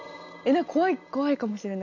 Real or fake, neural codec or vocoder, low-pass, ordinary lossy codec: real; none; 7.2 kHz; none